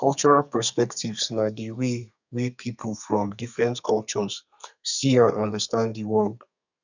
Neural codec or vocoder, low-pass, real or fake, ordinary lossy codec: codec, 32 kHz, 1.9 kbps, SNAC; 7.2 kHz; fake; none